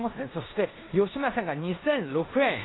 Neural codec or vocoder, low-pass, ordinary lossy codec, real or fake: codec, 16 kHz in and 24 kHz out, 0.9 kbps, LongCat-Audio-Codec, four codebook decoder; 7.2 kHz; AAC, 16 kbps; fake